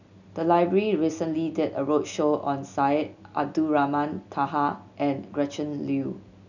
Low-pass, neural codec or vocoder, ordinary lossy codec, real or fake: 7.2 kHz; none; none; real